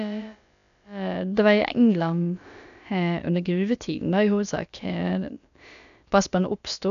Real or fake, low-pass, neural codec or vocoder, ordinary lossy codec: fake; 7.2 kHz; codec, 16 kHz, about 1 kbps, DyCAST, with the encoder's durations; none